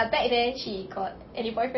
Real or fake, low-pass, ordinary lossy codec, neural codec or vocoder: real; 7.2 kHz; MP3, 24 kbps; none